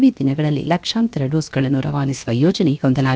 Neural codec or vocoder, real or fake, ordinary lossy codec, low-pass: codec, 16 kHz, 0.7 kbps, FocalCodec; fake; none; none